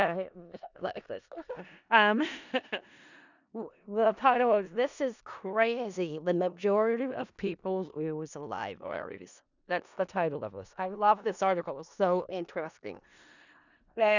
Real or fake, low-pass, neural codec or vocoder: fake; 7.2 kHz; codec, 16 kHz in and 24 kHz out, 0.4 kbps, LongCat-Audio-Codec, four codebook decoder